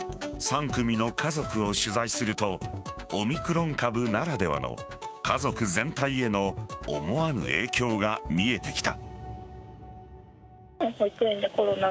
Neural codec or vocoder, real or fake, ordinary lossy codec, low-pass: codec, 16 kHz, 6 kbps, DAC; fake; none; none